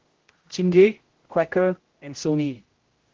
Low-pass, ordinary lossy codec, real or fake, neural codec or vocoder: 7.2 kHz; Opus, 16 kbps; fake; codec, 16 kHz, 0.5 kbps, X-Codec, HuBERT features, trained on general audio